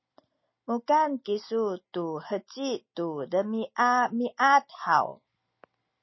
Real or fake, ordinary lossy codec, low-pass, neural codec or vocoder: real; MP3, 24 kbps; 7.2 kHz; none